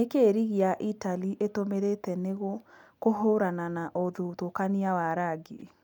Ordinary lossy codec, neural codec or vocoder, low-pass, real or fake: none; none; 19.8 kHz; real